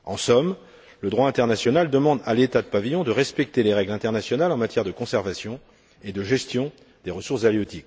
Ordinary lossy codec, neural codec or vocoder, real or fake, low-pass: none; none; real; none